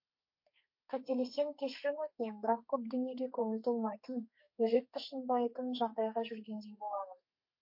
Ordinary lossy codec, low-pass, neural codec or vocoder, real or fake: MP3, 32 kbps; 5.4 kHz; codec, 32 kHz, 1.9 kbps, SNAC; fake